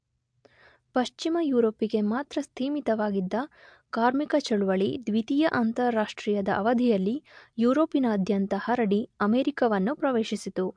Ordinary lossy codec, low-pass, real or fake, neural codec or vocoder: MP3, 64 kbps; 9.9 kHz; real; none